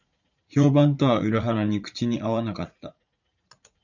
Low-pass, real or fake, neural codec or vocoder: 7.2 kHz; fake; vocoder, 44.1 kHz, 80 mel bands, Vocos